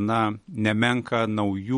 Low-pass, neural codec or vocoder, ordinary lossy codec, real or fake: 19.8 kHz; none; MP3, 48 kbps; real